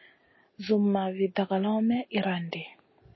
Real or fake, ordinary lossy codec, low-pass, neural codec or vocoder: real; MP3, 24 kbps; 7.2 kHz; none